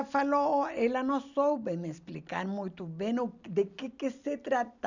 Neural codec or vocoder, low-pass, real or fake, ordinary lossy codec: none; 7.2 kHz; real; none